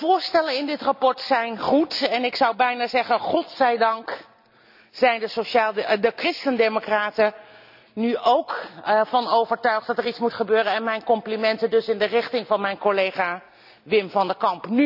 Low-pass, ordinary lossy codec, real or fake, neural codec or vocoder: 5.4 kHz; none; real; none